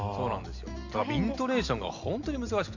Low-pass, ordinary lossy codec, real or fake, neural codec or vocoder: 7.2 kHz; none; real; none